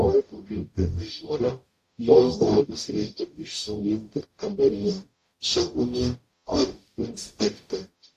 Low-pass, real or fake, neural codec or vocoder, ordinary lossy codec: 14.4 kHz; fake; codec, 44.1 kHz, 0.9 kbps, DAC; AAC, 64 kbps